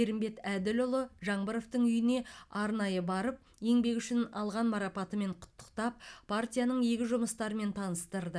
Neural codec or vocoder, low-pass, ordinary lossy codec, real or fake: none; none; none; real